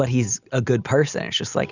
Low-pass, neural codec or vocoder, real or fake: 7.2 kHz; none; real